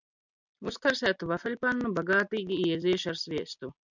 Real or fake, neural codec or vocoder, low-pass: real; none; 7.2 kHz